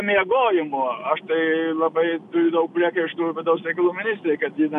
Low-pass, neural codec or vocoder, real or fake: 10.8 kHz; none; real